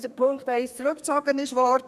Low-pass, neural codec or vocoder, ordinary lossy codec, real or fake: 14.4 kHz; codec, 32 kHz, 1.9 kbps, SNAC; none; fake